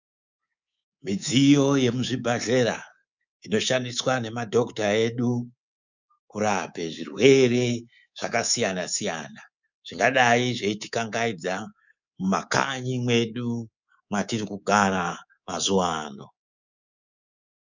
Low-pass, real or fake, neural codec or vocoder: 7.2 kHz; fake; codec, 24 kHz, 3.1 kbps, DualCodec